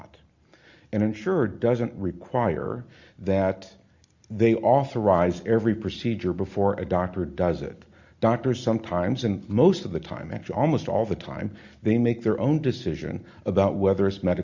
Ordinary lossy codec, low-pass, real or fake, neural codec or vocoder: Opus, 64 kbps; 7.2 kHz; real; none